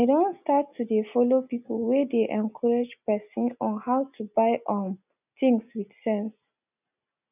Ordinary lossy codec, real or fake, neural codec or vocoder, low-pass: none; real; none; 3.6 kHz